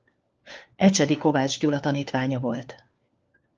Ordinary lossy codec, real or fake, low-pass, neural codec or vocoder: Opus, 24 kbps; fake; 7.2 kHz; codec, 16 kHz, 4 kbps, FunCodec, trained on LibriTTS, 50 frames a second